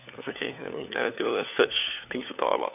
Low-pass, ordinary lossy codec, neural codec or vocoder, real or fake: 3.6 kHz; none; codec, 16 kHz, 4 kbps, FunCodec, trained on LibriTTS, 50 frames a second; fake